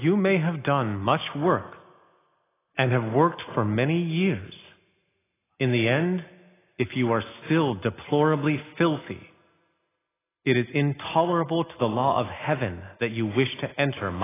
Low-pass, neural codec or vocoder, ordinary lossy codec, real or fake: 3.6 kHz; none; AAC, 16 kbps; real